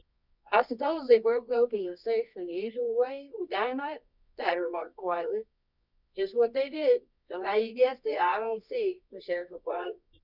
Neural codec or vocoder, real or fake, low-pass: codec, 24 kHz, 0.9 kbps, WavTokenizer, medium music audio release; fake; 5.4 kHz